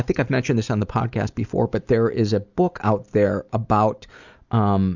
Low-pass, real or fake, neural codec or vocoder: 7.2 kHz; real; none